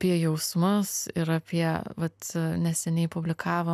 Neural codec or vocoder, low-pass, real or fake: none; 14.4 kHz; real